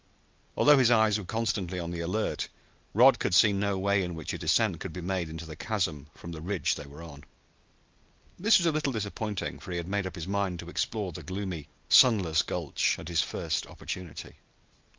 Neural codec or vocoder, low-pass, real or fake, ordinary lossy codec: none; 7.2 kHz; real; Opus, 24 kbps